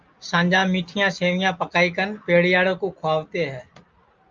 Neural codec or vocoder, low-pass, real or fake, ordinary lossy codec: none; 7.2 kHz; real; Opus, 24 kbps